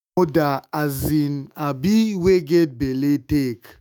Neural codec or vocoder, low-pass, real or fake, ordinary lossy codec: autoencoder, 48 kHz, 128 numbers a frame, DAC-VAE, trained on Japanese speech; none; fake; none